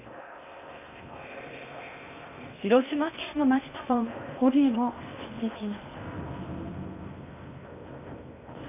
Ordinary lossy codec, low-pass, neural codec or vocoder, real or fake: none; 3.6 kHz; codec, 16 kHz in and 24 kHz out, 0.6 kbps, FocalCodec, streaming, 2048 codes; fake